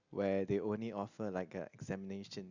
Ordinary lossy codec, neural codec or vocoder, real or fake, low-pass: Opus, 64 kbps; none; real; 7.2 kHz